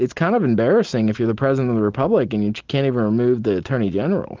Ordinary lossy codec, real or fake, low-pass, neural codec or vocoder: Opus, 24 kbps; real; 7.2 kHz; none